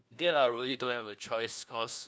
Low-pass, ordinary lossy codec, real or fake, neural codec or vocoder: none; none; fake; codec, 16 kHz, 1 kbps, FunCodec, trained on LibriTTS, 50 frames a second